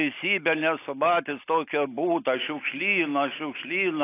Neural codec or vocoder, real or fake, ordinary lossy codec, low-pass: none; real; AAC, 24 kbps; 3.6 kHz